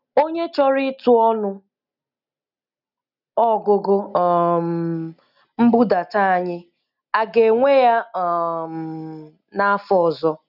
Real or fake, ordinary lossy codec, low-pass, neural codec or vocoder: real; none; 5.4 kHz; none